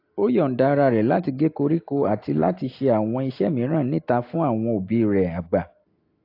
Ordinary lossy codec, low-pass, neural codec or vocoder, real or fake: AAC, 32 kbps; 5.4 kHz; none; real